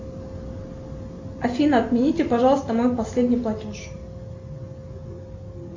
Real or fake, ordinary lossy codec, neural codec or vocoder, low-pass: real; MP3, 64 kbps; none; 7.2 kHz